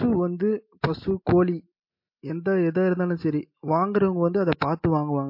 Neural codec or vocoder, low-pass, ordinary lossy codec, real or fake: none; 5.4 kHz; MP3, 32 kbps; real